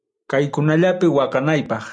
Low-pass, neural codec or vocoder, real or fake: 9.9 kHz; none; real